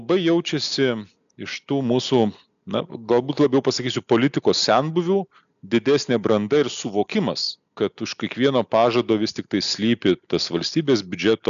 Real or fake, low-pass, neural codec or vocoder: real; 7.2 kHz; none